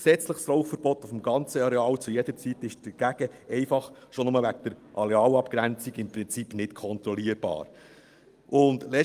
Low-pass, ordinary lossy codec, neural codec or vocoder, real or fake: 14.4 kHz; Opus, 32 kbps; none; real